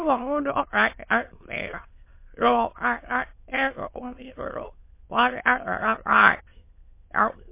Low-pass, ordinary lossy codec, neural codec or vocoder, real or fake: 3.6 kHz; MP3, 24 kbps; autoencoder, 22.05 kHz, a latent of 192 numbers a frame, VITS, trained on many speakers; fake